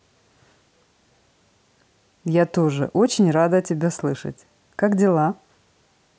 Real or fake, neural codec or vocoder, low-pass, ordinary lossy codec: real; none; none; none